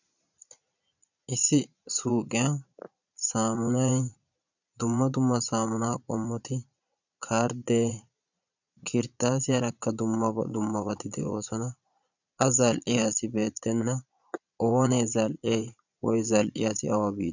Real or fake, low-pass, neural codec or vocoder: fake; 7.2 kHz; vocoder, 22.05 kHz, 80 mel bands, Vocos